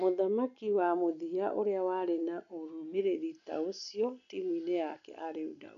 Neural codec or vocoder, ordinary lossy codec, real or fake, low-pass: none; none; real; 7.2 kHz